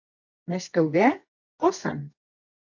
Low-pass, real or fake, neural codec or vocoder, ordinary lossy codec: 7.2 kHz; fake; codec, 24 kHz, 0.9 kbps, WavTokenizer, medium music audio release; AAC, 48 kbps